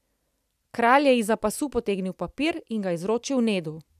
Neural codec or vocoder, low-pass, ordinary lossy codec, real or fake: none; 14.4 kHz; none; real